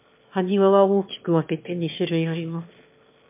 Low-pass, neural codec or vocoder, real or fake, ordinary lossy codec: 3.6 kHz; autoencoder, 22.05 kHz, a latent of 192 numbers a frame, VITS, trained on one speaker; fake; MP3, 32 kbps